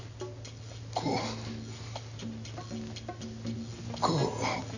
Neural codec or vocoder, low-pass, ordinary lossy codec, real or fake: none; 7.2 kHz; none; real